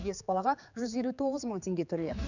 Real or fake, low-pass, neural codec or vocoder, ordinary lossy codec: fake; 7.2 kHz; codec, 16 kHz, 4 kbps, X-Codec, HuBERT features, trained on general audio; none